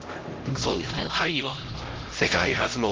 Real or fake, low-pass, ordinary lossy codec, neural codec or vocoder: fake; 7.2 kHz; Opus, 16 kbps; codec, 16 kHz, 0.5 kbps, X-Codec, HuBERT features, trained on LibriSpeech